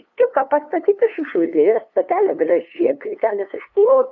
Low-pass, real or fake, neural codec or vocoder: 7.2 kHz; fake; codec, 16 kHz, 2 kbps, FunCodec, trained on LibriTTS, 25 frames a second